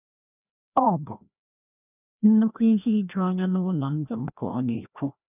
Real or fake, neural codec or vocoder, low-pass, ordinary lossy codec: fake; codec, 16 kHz, 1 kbps, FreqCodec, larger model; 3.6 kHz; none